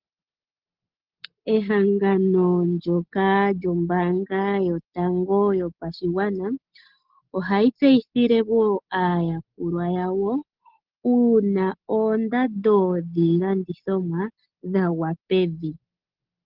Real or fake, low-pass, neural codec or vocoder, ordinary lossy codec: real; 5.4 kHz; none; Opus, 16 kbps